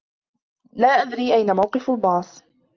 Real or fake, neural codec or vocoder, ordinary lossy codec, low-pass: real; none; Opus, 24 kbps; 7.2 kHz